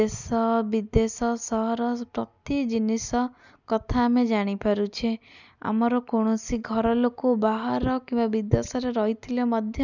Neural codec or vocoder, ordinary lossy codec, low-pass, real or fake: none; none; 7.2 kHz; real